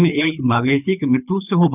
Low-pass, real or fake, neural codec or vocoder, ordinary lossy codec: 3.6 kHz; fake; codec, 24 kHz, 3 kbps, HILCodec; none